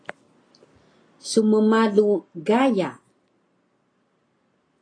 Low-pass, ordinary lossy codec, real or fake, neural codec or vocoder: 9.9 kHz; AAC, 32 kbps; real; none